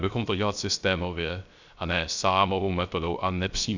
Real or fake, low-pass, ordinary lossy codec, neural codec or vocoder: fake; 7.2 kHz; Opus, 64 kbps; codec, 16 kHz, 0.3 kbps, FocalCodec